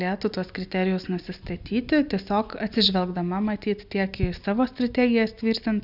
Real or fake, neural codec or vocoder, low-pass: real; none; 5.4 kHz